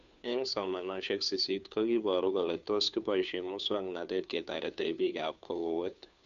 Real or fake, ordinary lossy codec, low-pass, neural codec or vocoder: fake; none; 7.2 kHz; codec, 16 kHz, 2 kbps, FunCodec, trained on Chinese and English, 25 frames a second